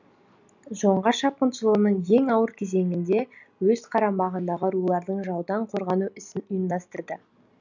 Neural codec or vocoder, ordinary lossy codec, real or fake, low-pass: none; none; real; 7.2 kHz